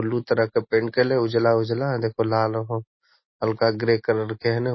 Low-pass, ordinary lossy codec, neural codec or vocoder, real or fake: 7.2 kHz; MP3, 24 kbps; none; real